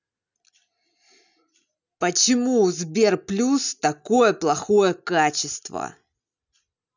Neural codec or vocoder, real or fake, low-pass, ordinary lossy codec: none; real; 7.2 kHz; none